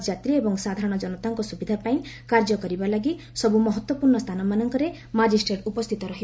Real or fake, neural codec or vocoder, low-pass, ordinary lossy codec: real; none; none; none